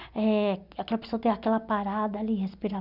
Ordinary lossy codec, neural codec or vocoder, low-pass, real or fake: none; none; 5.4 kHz; real